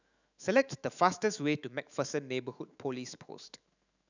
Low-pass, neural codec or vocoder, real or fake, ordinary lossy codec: 7.2 kHz; autoencoder, 48 kHz, 128 numbers a frame, DAC-VAE, trained on Japanese speech; fake; none